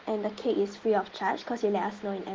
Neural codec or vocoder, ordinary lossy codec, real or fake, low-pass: none; Opus, 16 kbps; real; 7.2 kHz